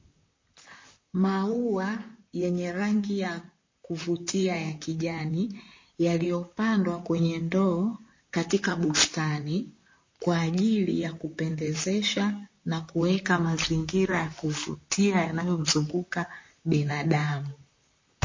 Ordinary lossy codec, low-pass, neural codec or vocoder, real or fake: MP3, 32 kbps; 7.2 kHz; vocoder, 44.1 kHz, 128 mel bands, Pupu-Vocoder; fake